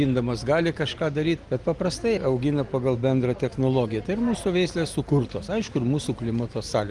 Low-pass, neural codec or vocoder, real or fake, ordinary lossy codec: 10.8 kHz; none; real; Opus, 24 kbps